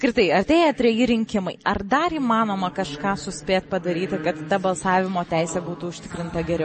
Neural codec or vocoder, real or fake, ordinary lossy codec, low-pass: none; real; MP3, 32 kbps; 10.8 kHz